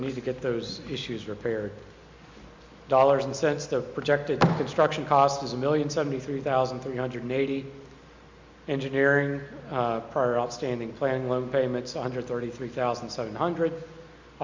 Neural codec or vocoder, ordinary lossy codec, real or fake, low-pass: none; MP3, 64 kbps; real; 7.2 kHz